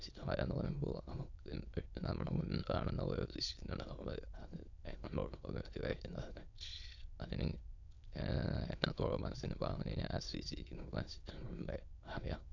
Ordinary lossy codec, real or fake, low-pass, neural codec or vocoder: none; fake; 7.2 kHz; autoencoder, 22.05 kHz, a latent of 192 numbers a frame, VITS, trained on many speakers